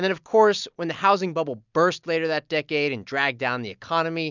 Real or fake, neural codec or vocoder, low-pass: real; none; 7.2 kHz